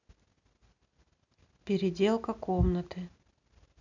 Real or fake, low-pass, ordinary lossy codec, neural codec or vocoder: real; 7.2 kHz; none; none